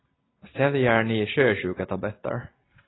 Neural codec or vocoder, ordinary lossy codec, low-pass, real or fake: none; AAC, 16 kbps; 7.2 kHz; real